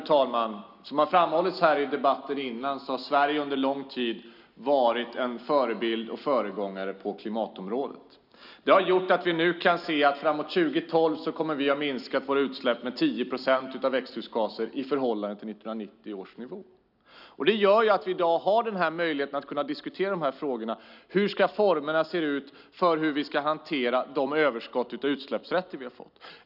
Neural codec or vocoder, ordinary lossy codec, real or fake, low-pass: none; none; real; 5.4 kHz